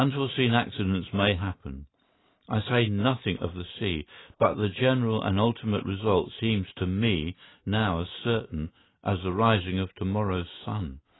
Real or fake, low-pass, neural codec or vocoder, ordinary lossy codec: real; 7.2 kHz; none; AAC, 16 kbps